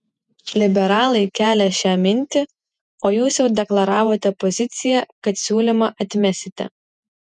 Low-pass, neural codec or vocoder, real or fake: 10.8 kHz; vocoder, 48 kHz, 128 mel bands, Vocos; fake